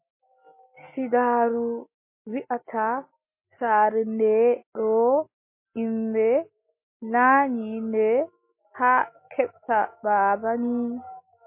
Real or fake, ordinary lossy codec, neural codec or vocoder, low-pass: real; MP3, 24 kbps; none; 3.6 kHz